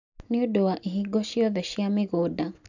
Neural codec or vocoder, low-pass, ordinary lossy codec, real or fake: none; 7.2 kHz; none; real